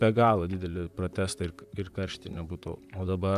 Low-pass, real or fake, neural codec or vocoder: 14.4 kHz; fake; vocoder, 44.1 kHz, 128 mel bands, Pupu-Vocoder